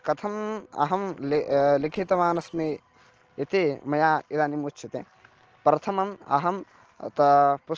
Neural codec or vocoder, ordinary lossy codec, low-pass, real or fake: none; Opus, 16 kbps; 7.2 kHz; real